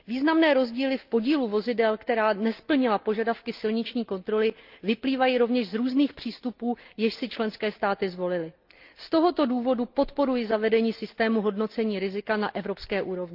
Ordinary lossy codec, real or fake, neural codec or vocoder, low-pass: Opus, 24 kbps; real; none; 5.4 kHz